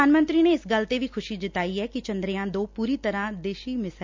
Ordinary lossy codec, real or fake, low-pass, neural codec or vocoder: MP3, 64 kbps; real; 7.2 kHz; none